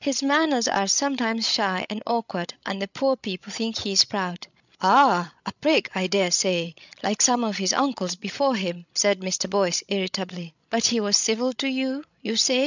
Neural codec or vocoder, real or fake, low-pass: codec, 16 kHz, 16 kbps, FreqCodec, larger model; fake; 7.2 kHz